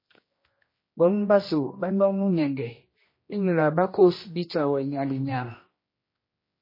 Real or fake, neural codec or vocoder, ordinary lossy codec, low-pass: fake; codec, 16 kHz, 1 kbps, X-Codec, HuBERT features, trained on general audio; MP3, 24 kbps; 5.4 kHz